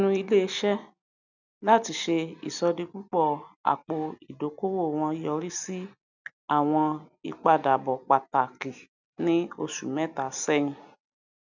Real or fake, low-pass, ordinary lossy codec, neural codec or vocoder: real; 7.2 kHz; none; none